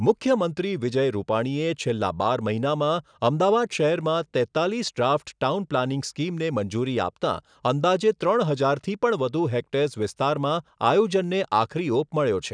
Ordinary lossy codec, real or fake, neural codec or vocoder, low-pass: none; real; none; 9.9 kHz